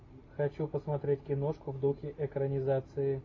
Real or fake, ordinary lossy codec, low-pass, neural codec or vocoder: real; AAC, 32 kbps; 7.2 kHz; none